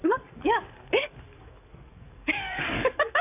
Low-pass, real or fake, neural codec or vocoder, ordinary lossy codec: 3.6 kHz; fake; codec, 44.1 kHz, 7.8 kbps, DAC; none